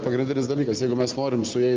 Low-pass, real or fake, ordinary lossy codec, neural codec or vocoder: 7.2 kHz; real; Opus, 24 kbps; none